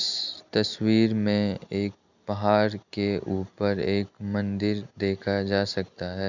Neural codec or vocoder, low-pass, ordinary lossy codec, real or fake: none; 7.2 kHz; none; real